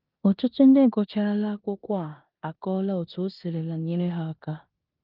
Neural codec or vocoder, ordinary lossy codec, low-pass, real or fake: codec, 16 kHz in and 24 kHz out, 0.9 kbps, LongCat-Audio-Codec, four codebook decoder; Opus, 32 kbps; 5.4 kHz; fake